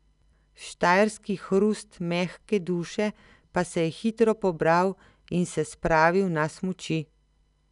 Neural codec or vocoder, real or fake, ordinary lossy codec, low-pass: none; real; none; 10.8 kHz